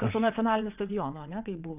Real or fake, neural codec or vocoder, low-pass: fake; vocoder, 22.05 kHz, 80 mel bands, WaveNeXt; 3.6 kHz